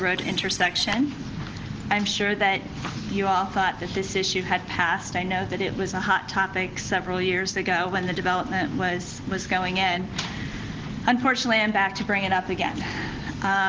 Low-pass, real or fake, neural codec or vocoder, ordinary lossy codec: 7.2 kHz; real; none; Opus, 16 kbps